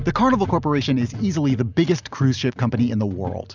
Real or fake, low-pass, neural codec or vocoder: real; 7.2 kHz; none